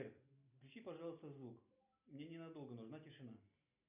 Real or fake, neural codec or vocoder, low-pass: real; none; 3.6 kHz